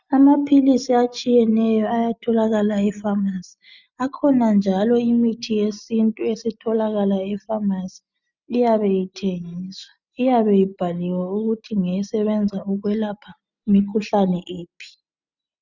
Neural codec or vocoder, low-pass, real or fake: none; 7.2 kHz; real